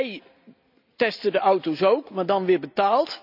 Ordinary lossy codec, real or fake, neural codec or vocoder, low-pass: none; real; none; 5.4 kHz